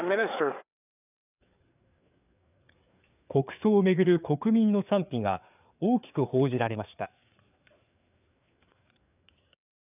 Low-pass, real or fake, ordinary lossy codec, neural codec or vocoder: 3.6 kHz; fake; none; codec, 16 kHz, 4 kbps, FreqCodec, larger model